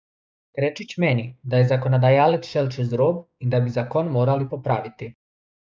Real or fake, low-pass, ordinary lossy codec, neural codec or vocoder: fake; none; none; codec, 16 kHz, 6 kbps, DAC